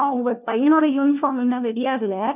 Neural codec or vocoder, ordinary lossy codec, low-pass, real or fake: codec, 16 kHz, 1 kbps, FunCodec, trained on LibriTTS, 50 frames a second; none; 3.6 kHz; fake